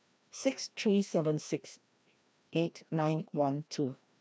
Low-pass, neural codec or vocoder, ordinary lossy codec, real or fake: none; codec, 16 kHz, 1 kbps, FreqCodec, larger model; none; fake